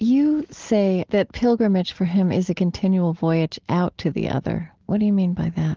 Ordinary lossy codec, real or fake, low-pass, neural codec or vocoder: Opus, 16 kbps; real; 7.2 kHz; none